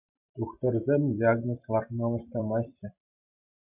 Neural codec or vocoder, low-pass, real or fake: vocoder, 44.1 kHz, 128 mel bands every 256 samples, BigVGAN v2; 3.6 kHz; fake